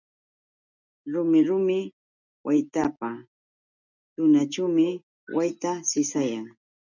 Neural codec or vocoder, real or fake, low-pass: none; real; 7.2 kHz